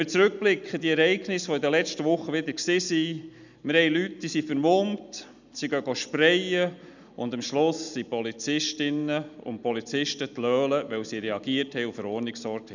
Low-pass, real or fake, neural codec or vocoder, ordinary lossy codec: 7.2 kHz; real; none; none